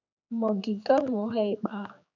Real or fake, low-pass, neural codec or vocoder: fake; 7.2 kHz; codec, 16 kHz, 4 kbps, X-Codec, HuBERT features, trained on general audio